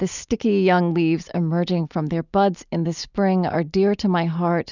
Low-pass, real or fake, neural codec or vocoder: 7.2 kHz; real; none